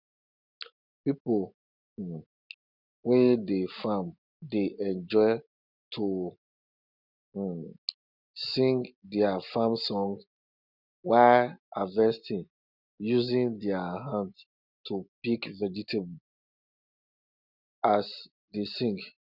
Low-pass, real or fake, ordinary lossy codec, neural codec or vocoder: 5.4 kHz; real; none; none